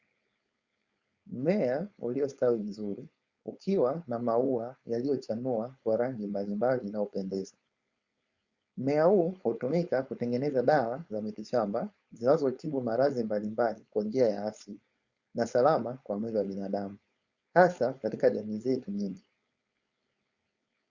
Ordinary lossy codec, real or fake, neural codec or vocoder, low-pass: Opus, 64 kbps; fake; codec, 16 kHz, 4.8 kbps, FACodec; 7.2 kHz